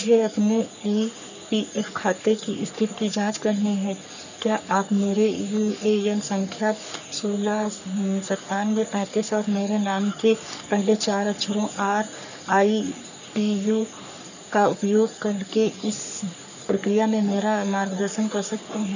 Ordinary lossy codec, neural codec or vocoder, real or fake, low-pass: none; codec, 44.1 kHz, 3.4 kbps, Pupu-Codec; fake; 7.2 kHz